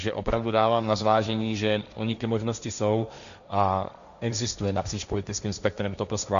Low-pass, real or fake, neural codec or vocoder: 7.2 kHz; fake; codec, 16 kHz, 1.1 kbps, Voila-Tokenizer